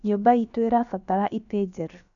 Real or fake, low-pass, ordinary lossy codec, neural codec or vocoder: fake; 7.2 kHz; none; codec, 16 kHz, about 1 kbps, DyCAST, with the encoder's durations